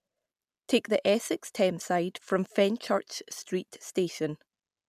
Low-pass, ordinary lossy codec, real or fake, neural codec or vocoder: 14.4 kHz; none; real; none